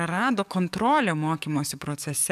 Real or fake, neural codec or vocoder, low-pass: fake; codec, 44.1 kHz, 7.8 kbps, DAC; 14.4 kHz